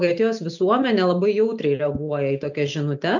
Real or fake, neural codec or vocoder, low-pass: real; none; 7.2 kHz